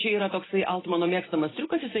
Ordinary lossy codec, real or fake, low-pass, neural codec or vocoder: AAC, 16 kbps; real; 7.2 kHz; none